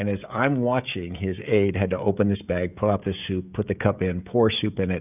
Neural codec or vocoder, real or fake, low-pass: codec, 16 kHz, 16 kbps, FreqCodec, smaller model; fake; 3.6 kHz